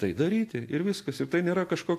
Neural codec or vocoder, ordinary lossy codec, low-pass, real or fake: none; AAC, 64 kbps; 14.4 kHz; real